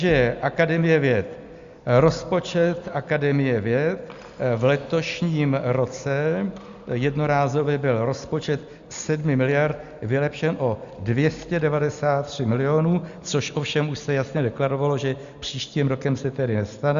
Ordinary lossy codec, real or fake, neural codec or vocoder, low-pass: Opus, 64 kbps; real; none; 7.2 kHz